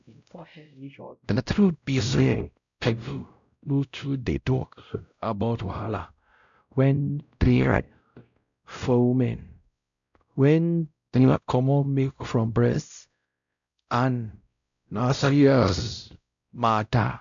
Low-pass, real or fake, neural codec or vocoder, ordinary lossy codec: 7.2 kHz; fake; codec, 16 kHz, 0.5 kbps, X-Codec, WavLM features, trained on Multilingual LibriSpeech; none